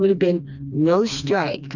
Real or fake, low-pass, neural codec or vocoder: fake; 7.2 kHz; codec, 16 kHz, 2 kbps, FreqCodec, smaller model